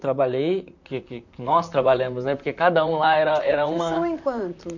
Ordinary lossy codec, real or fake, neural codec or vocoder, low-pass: none; fake; vocoder, 44.1 kHz, 128 mel bands, Pupu-Vocoder; 7.2 kHz